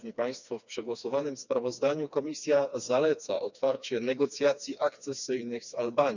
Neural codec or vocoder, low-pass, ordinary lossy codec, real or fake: codec, 16 kHz, 2 kbps, FreqCodec, smaller model; 7.2 kHz; none; fake